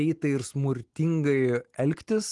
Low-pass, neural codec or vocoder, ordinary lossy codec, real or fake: 10.8 kHz; none; Opus, 24 kbps; real